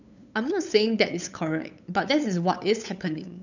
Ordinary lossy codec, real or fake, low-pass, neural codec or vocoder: none; fake; 7.2 kHz; codec, 16 kHz, 8 kbps, FunCodec, trained on LibriTTS, 25 frames a second